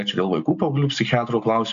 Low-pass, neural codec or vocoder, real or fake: 7.2 kHz; none; real